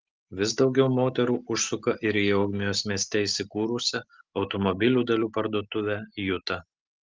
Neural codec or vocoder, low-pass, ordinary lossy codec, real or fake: none; 7.2 kHz; Opus, 32 kbps; real